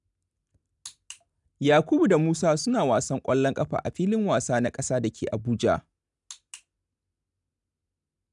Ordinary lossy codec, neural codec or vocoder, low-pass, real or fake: none; none; 10.8 kHz; real